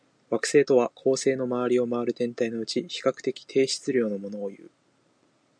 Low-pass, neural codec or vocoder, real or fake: 9.9 kHz; none; real